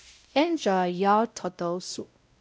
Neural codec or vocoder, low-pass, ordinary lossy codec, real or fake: codec, 16 kHz, 0.5 kbps, X-Codec, WavLM features, trained on Multilingual LibriSpeech; none; none; fake